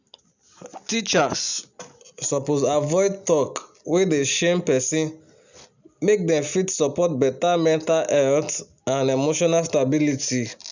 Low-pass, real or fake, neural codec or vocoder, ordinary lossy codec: 7.2 kHz; real; none; none